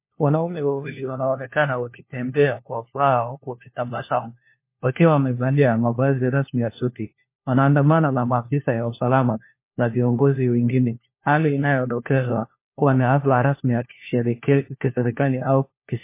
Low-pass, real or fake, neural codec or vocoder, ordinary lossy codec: 3.6 kHz; fake; codec, 16 kHz, 1 kbps, FunCodec, trained on LibriTTS, 50 frames a second; MP3, 24 kbps